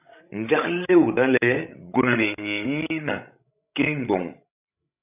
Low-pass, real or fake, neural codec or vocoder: 3.6 kHz; fake; codec, 16 kHz, 16 kbps, FreqCodec, larger model